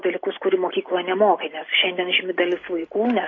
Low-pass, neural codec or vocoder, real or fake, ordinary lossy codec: 7.2 kHz; none; real; AAC, 32 kbps